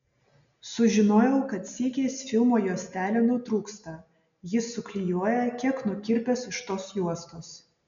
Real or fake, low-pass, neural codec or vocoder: real; 7.2 kHz; none